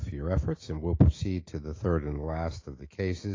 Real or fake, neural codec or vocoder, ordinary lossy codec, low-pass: fake; autoencoder, 48 kHz, 128 numbers a frame, DAC-VAE, trained on Japanese speech; AAC, 32 kbps; 7.2 kHz